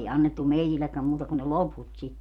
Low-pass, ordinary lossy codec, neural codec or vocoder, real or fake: 19.8 kHz; none; vocoder, 44.1 kHz, 128 mel bands every 256 samples, BigVGAN v2; fake